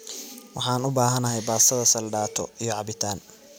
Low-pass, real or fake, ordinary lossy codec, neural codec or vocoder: none; real; none; none